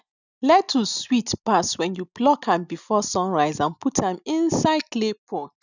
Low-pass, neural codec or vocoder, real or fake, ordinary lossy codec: 7.2 kHz; none; real; none